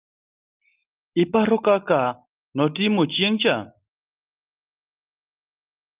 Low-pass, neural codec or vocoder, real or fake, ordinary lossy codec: 3.6 kHz; none; real; Opus, 32 kbps